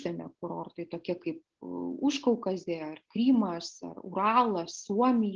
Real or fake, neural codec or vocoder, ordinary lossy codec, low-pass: real; none; Opus, 16 kbps; 7.2 kHz